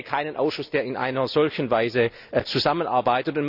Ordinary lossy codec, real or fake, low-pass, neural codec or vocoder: none; real; 5.4 kHz; none